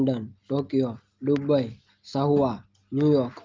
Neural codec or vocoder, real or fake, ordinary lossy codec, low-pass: none; real; Opus, 32 kbps; 7.2 kHz